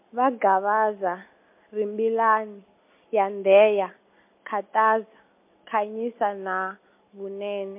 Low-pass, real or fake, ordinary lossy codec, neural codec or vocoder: 3.6 kHz; real; MP3, 24 kbps; none